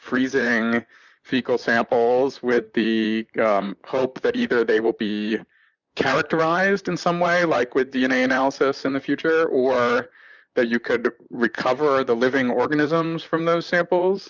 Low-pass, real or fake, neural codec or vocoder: 7.2 kHz; fake; vocoder, 44.1 kHz, 128 mel bands, Pupu-Vocoder